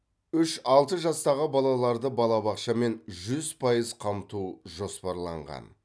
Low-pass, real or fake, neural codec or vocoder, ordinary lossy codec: none; real; none; none